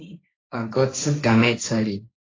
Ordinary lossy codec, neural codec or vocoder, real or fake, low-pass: AAC, 32 kbps; codec, 16 kHz, 1.1 kbps, Voila-Tokenizer; fake; 7.2 kHz